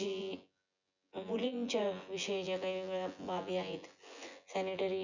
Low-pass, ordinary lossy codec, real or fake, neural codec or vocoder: 7.2 kHz; none; fake; vocoder, 24 kHz, 100 mel bands, Vocos